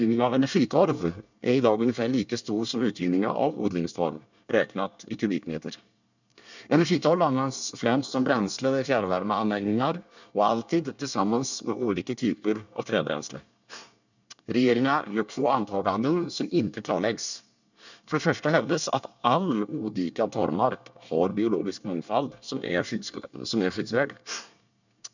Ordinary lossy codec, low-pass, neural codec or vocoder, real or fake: none; 7.2 kHz; codec, 24 kHz, 1 kbps, SNAC; fake